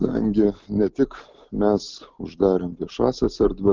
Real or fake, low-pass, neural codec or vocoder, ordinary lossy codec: real; 7.2 kHz; none; Opus, 32 kbps